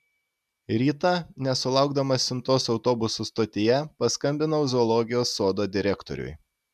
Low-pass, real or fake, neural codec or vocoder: 14.4 kHz; real; none